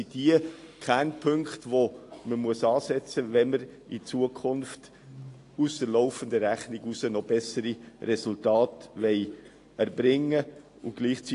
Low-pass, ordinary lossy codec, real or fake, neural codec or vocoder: 10.8 kHz; AAC, 48 kbps; real; none